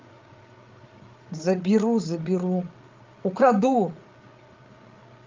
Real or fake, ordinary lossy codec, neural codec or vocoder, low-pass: fake; Opus, 32 kbps; codec, 16 kHz, 8 kbps, FreqCodec, larger model; 7.2 kHz